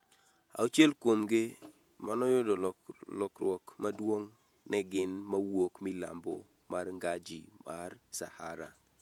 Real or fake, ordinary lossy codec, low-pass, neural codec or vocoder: fake; MP3, 96 kbps; 19.8 kHz; vocoder, 48 kHz, 128 mel bands, Vocos